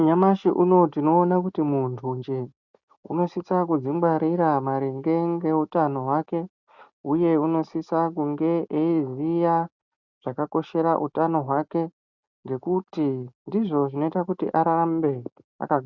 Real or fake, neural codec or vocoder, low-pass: real; none; 7.2 kHz